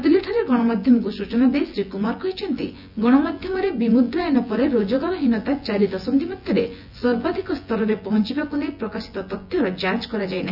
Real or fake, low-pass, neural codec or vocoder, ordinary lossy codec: fake; 5.4 kHz; vocoder, 24 kHz, 100 mel bands, Vocos; none